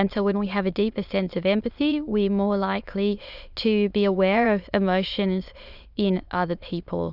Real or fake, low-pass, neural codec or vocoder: fake; 5.4 kHz; autoencoder, 22.05 kHz, a latent of 192 numbers a frame, VITS, trained on many speakers